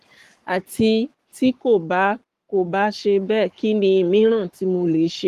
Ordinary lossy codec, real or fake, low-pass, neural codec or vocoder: Opus, 24 kbps; fake; 14.4 kHz; codec, 44.1 kHz, 7.8 kbps, Pupu-Codec